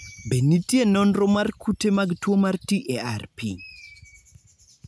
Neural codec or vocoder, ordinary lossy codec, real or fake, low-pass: none; none; real; none